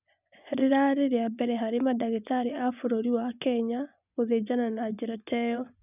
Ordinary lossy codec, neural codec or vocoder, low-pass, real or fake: none; vocoder, 24 kHz, 100 mel bands, Vocos; 3.6 kHz; fake